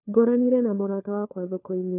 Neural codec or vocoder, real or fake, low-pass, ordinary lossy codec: codec, 16 kHz, 4 kbps, FunCodec, trained on LibriTTS, 50 frames a second; fake; 3.6 kHz; none